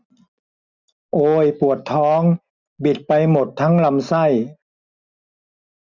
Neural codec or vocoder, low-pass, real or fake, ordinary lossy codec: none; 7.2 kHz; real; none